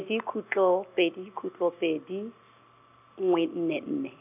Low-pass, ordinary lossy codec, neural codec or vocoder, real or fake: 3.6 kHz; none; none; real